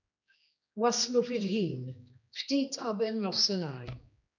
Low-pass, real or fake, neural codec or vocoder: 7.2 kHz; fake; codec, 16 kHz, 2 kbps, X-Codec, HuBERT features, trained on general audio